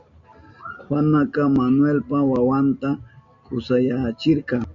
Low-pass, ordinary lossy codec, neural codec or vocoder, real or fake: 7.2 kHz; MP3, 64 kbps; none; real